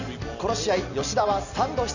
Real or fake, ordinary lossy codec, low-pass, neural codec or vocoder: real; none; 7.2 kHz; none